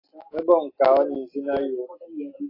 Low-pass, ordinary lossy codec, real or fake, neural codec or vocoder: 5.4 kHz; AAC, 24 kbps; real; none